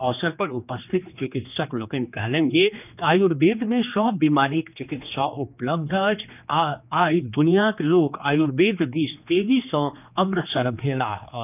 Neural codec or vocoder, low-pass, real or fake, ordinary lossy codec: codec, 16 kHz, 2 kbps, X-Codec, HuBERT features, trained on general audio; 3.6 kHz; fake; none